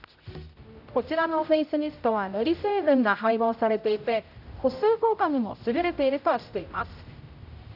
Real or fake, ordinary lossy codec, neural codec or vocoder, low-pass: fake; none; codec, 16 kHz, 0.5 kbps, X-Codec, HuBERT features, trained on general audio; 5.4 kHz